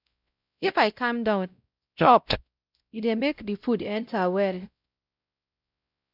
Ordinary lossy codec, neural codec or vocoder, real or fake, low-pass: none; codec, 16 kHz, 0.5 kbps, X-Codec, WavLM features, trained on Multilingual LibriSpeech; fake; 5.4 kHz